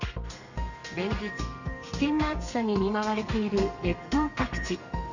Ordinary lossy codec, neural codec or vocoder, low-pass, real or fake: none; codec, 32 kHz, 1.9 kbps, SNAC; 7.2 kHz; fake